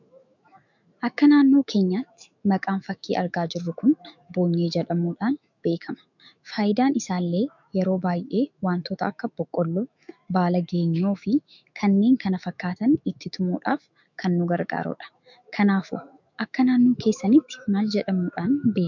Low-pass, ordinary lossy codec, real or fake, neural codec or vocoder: 7.2 kHz; MP3, 64 kbps; fake; autoencoder, 48 kHz, 128 numbers a frame, DAC-VAE, trained on Japanese speech